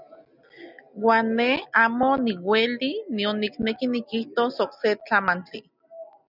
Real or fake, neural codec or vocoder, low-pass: real; none; 5.4 kHz